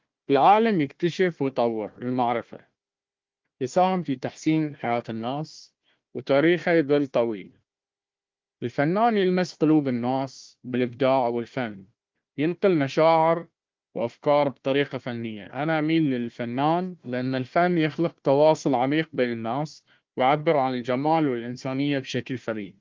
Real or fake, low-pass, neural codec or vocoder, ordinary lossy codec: fake; 7.2 kHz; codec, 16 kHz, 1 kbps, FunCodec, trained on Chinese and English, 50 frames a second; Opus, 32 kbps